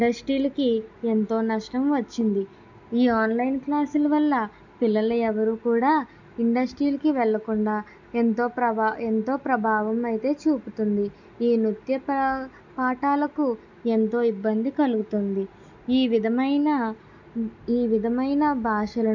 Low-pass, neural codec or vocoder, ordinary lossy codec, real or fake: 7.2 kHz; none; none; real